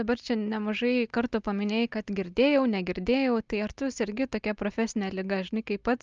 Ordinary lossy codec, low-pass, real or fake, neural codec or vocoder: Opus, 24 kbps; 7.2 kHz; real; none